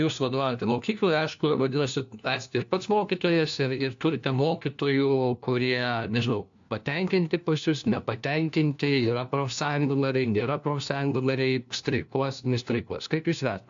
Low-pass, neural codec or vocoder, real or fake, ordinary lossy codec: 7.2 kHz; codec, 16 kHz, 1 kbps, FunCodec, trained on LibriTTS, 50 frames a second; fake; AAC, 64 kbps